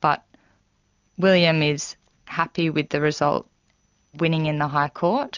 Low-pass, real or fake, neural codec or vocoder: 7.2 kHz; real; none